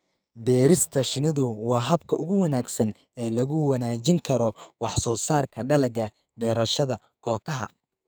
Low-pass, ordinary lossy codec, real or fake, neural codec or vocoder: none; none; fake; codec, 44.1 kHz, 2.6 kbps, SNAC